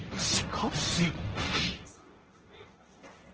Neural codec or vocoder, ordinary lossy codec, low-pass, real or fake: codec, 16 kHz, 1.1 kbps, Voila-Tokenizer; Opus, 16 kbps; 7.2 kHz; fake